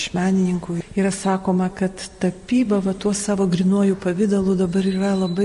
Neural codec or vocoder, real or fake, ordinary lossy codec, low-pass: vocoder, 44.1 kHz, 128 mel bands every 256 samples, BigVGAN v2; fake; MP3, 48 kbps; 14.4 kHz